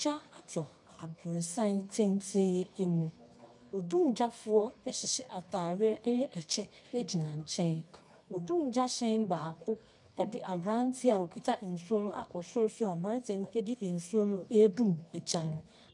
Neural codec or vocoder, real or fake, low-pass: codec, 24 kHz, 0.9 kbps, WavTokenizer, medium music audio release; fake; 10.8 kHz